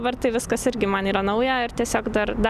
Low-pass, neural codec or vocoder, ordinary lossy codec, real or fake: 14.4 kHz; none; Opus, 64 kbps; real